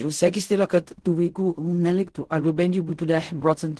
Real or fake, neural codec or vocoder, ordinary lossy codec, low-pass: fake; codec, 16 kHz in and 24 kHz out, 0.4 kbps, LongCat-Audio-Codec, fine tuned four codebook decoder; Opus, 16 kbps; 10.8 kHz